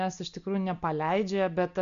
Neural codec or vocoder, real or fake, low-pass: none; real; 7.2 kHz